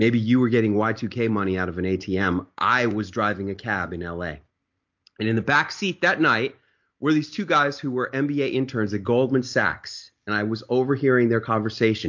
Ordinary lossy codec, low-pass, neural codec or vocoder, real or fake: MP3, 48 kbps; 7.2 kHz; none; real